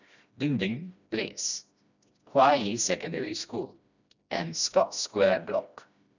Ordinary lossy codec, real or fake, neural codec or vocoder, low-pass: none; fake; codec, 16 kHz, 1 kbps, FreqCodec, smaller model; 7.2 kHz